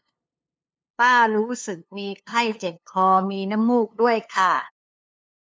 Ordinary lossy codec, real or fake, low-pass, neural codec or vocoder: none; fake; none; codec, 16 kHz, 2 kbps, FunCodec, trained on LibriTTS, 25 frames a second